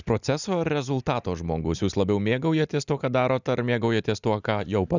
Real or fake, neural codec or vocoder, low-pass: real; none; 7.2 kHz